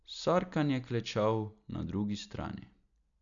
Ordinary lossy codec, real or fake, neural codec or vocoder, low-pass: none; real; none; 7.2 kHz